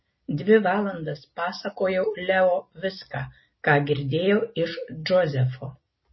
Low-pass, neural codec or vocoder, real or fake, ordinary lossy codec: 7.2 kHz; none; real; MP3, 24 kbps